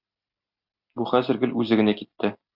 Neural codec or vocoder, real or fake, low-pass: none; real; 5.4 kHz